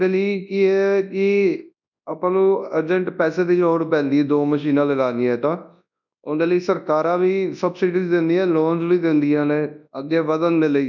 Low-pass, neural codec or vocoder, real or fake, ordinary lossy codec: 7.2 kHz; codec, 24 kHz, 0.9 kbps, WavTokenizer, large speech release; fake; none